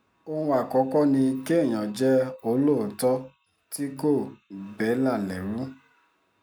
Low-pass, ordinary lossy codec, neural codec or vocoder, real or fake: 19.8 kHz; none; none; real